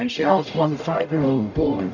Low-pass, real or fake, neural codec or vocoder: 7.2 kHz; fake; codec, 44.1 kHz, 0.9 kbps, DAC